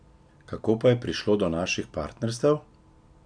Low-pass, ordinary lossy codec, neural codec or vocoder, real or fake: 9.9 kHz; none; none; real